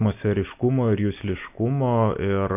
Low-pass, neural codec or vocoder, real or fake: 3.6 kHz; none; real